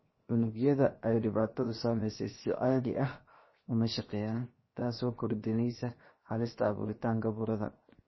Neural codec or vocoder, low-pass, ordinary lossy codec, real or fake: codec, 16 kHz, 0.7 kbps, FocalCodec; 7.2 kHz; MP3, 24 kbps; fake